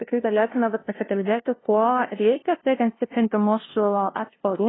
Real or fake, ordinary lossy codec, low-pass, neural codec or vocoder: fake; AAC, 16 kbps; 7.2 kHz; codec, 16 kHz, 0.5 kbps, FunCodec, trained on LibriTTS, 25 frames a second